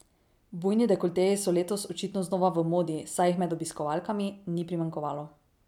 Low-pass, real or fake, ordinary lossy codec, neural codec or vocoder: 19.8 kHz; real; none; none